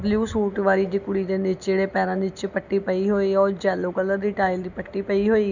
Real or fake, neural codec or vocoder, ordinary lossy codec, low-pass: real; none; none; 7.2 kHz